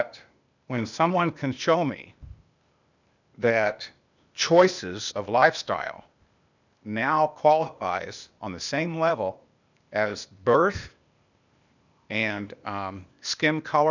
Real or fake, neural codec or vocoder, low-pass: fake; codec, 16 kHz, 0.8 kbps, ZipCodec; 7.2 kHz